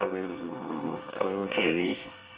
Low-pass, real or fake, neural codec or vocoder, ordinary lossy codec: 3.6 kHz; fake; codec, 24 kHz, 1 kbps, SNAC; Opus, 24 kbps